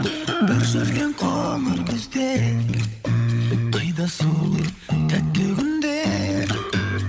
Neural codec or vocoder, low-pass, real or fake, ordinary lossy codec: codec, 16 kHz, 16 kbps, FunCodec, trained on LibriTTS, 50 frames a second; none; fake; none